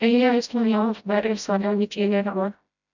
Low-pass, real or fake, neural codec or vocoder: 7.2 kHz; fake; codec, 16 kHz, 0.5 kbps, FreqCodec, smaller model